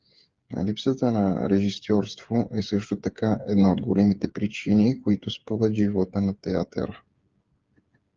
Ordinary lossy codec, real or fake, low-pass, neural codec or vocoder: Opus, 32 kbps; fake; 7.2 kHz; codec, 16 kHz, 8 kbps, FreqCodec, smaller model